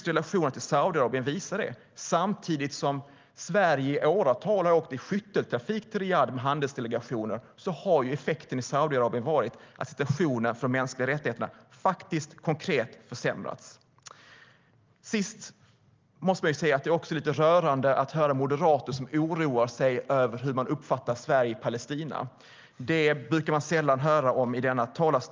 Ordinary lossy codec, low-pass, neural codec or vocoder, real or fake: Opus, 32 kbps; 7.2 kHz; none; real